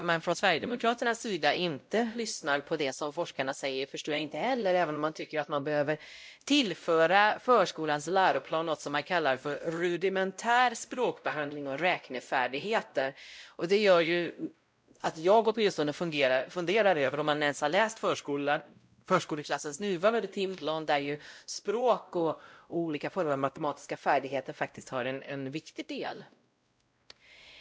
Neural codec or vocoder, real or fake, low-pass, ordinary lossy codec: codec, 16 kHz, 0.5 kbps, X-Codec, WavLM features, trained on Multilingual LibriSpeech; fake; none; none